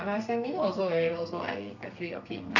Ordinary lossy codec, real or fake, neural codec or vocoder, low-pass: none; fake; codec, 32 kHz, 1.9 kbps, SNAC; 7.2 kHz